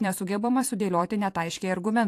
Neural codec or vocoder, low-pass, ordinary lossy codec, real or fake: vocoder, 44.1 kHz, 128 mel bands every 512 samples, BigVGAN v2; 14.4 kHz; AAC, 64 kbps; fake